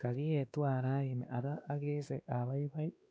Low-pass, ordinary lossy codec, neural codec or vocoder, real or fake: none; none; codec, 16 kHz, 2 kbps, X-Codec, WavLM features, trained on Multilingual LibriSpeech; fake